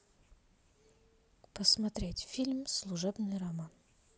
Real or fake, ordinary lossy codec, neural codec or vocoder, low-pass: real; none; none; none